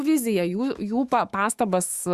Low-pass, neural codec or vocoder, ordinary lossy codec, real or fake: 14.4 kHz; autoencoder, 48 kHz, 128 numbers a frame, DAC-VAE, trained on Japanese speech; Opus, 64 kbps; fake